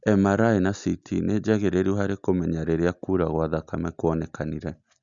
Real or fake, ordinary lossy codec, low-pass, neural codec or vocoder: real; Opus, 64 kbps; 7.2 kHz; none